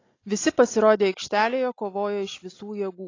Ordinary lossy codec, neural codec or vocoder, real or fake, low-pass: AAC, 32 kbps; none; real; 7.2 kHz